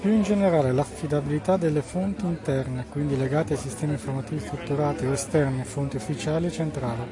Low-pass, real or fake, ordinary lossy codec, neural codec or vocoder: 10.8 kHz; real; AAC, 48 kbps; none